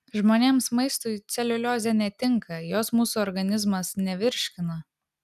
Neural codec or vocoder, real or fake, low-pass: none; real; 14.4 kHz